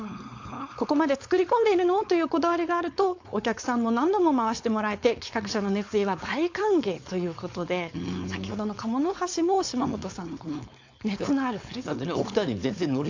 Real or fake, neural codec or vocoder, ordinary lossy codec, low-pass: fake; codec, 16 kHz, 4.8 kbps, FACodec; AAC, 48 kbps; 7.2 kHz